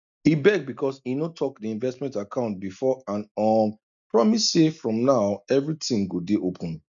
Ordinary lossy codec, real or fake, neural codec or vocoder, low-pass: none; real; none; 7.2 kHz